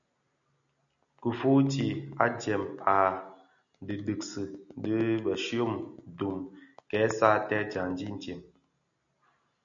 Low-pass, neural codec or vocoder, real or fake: 7.2 kHz; none; real